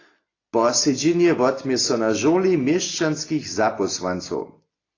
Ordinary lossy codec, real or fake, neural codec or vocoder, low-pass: AAC, 32 kbps; real; none; 7.2 kHz